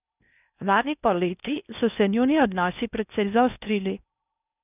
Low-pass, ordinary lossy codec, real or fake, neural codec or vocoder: 3.6 kHz; none; fake; codec, 16 kHz in and 24 kHz out, 0.6 kbps, FocalCodec, streaming, 2048 codes